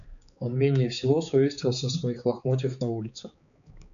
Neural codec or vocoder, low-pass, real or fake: codec, 16 kHz, 4 kbps, X-Codec, HuBERT features, trained on balanced general audio; 7.2 kHz; fake